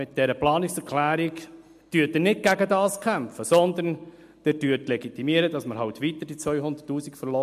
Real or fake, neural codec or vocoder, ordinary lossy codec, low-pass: real; none; MP3, 64 kbps; 14.4 kHz